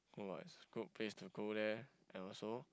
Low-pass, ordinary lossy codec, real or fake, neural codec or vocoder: none; none; real; none